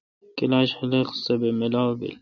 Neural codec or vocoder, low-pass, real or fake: none; 7.2 kHz; real